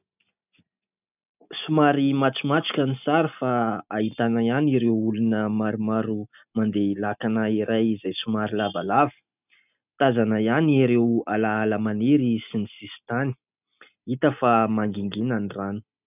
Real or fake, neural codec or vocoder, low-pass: real; none; 3.6 kHz